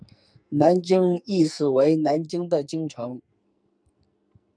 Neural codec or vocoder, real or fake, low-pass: codec, 44.1 kHz, 2.6 kbps, SNAC; fake; 9.9 kHz